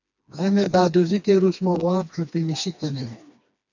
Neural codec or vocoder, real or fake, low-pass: codec, 16 kHz, 2 kbps, FreqCodec, smaller model; fake; 7.2 kHz